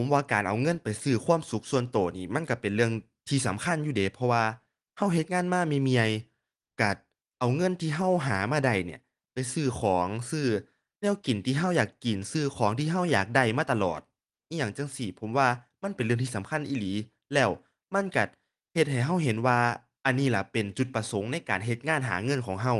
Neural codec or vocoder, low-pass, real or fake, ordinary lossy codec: none; 10.8 kHz; real; Opus, 24 kbps